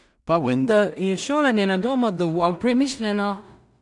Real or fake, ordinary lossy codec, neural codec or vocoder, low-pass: fake; none; codec, 16 kHz in and 24 kHz out, 0.4 kbps, LongCat-Audio-Codec, two codebook decoder; 10.8 kHz